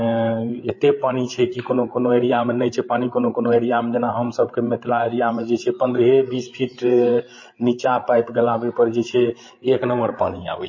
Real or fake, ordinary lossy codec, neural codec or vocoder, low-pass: fake; MP3, 32 kbps; codec, 16 kHz, 8 kbps, FreqCodec, larger model; 7.2 kHz